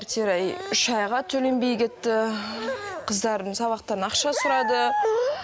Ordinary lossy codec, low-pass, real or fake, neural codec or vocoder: none; none; real; none